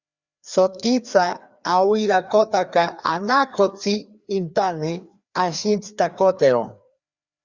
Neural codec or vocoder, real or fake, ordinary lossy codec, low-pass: codec, 16 kHz, 2 kbps, FreqCodec, larger model; fake; Opus, 64 kbps; 7.2 kHz